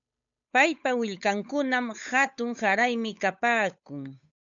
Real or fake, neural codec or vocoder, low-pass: fake; codec, 16 kHz, 8 kbps, FunCodec, trained on Chinese and English, 25 frames a second; 7.2 kHz